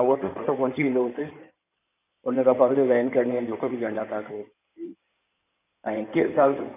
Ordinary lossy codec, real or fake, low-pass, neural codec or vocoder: none; fake; 3.6 kHz; codec, 16 kHz in and 24 kHz out, 2.2 kbps, FireRedTTS-2 codec